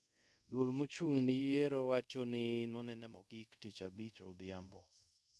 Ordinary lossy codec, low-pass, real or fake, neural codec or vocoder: none; none; fake; codec, 24 kHz, 0.5 kbps, DualCodec